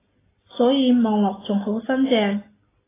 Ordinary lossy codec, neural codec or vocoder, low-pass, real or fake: AAC, 16 kbps; none; 3.6 kHz; real